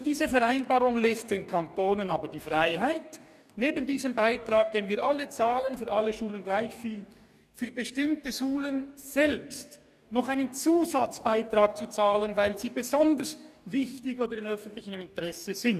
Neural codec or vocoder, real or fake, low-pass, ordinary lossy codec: codec, 44.1 kHz, 2.6 kbps, DAC; fake; 14.4 kHz; none